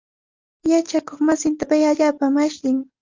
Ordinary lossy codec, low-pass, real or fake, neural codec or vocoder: Opus, 32 kbps; 7.2 kHz; real; none